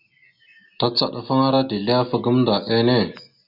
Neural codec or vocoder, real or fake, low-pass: none; real; 5.4 kHz